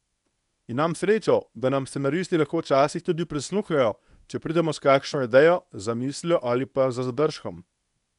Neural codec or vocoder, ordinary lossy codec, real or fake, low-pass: codec, 24 kHz, 0.9 kbps, WavTokenizer, medium speech release version 1; none; fake; 10.8 kHz